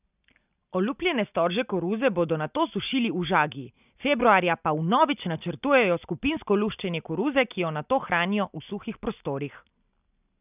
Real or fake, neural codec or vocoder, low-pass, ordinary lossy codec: real; none; 3.6 kHz; none